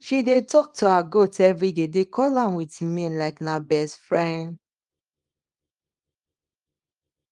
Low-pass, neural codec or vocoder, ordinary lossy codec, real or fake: 10.8 kHz; codec, 24 kHz, 0.9 kbps, WavTokenizer, small release; Opus, 32 kbps; fake